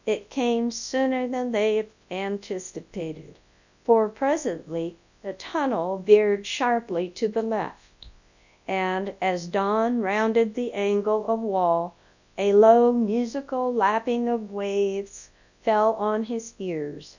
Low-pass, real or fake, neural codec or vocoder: 7.2 kHz; fake; codec, 24 kHz, 0.9 kbps, WavTokenizer, large speech release